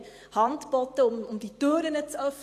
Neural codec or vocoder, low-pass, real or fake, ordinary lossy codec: vocoder, 44.1 kHz, 128 mel bands every 512 samples, BigVGAN v2; 14.4 kHz; fake; AAC, 64 kbps